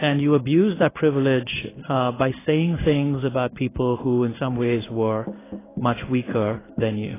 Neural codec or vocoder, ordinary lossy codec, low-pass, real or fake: codec, 16 kHz in and 24 kHz out, 1 kbps, XY-Tokenizer; AAC, 16 kbps; 3.6 kHz; fake